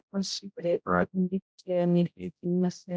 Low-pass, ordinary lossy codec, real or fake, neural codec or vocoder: none; none; fake; codec, 16 kHz, 0.5 kbps, X-Codec, HuBERT features, trained on general audio